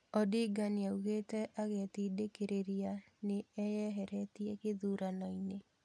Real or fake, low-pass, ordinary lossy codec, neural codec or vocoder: real; none; none; none